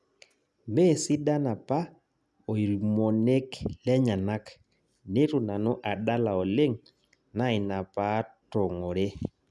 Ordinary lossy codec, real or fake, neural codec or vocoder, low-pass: none; real; none; none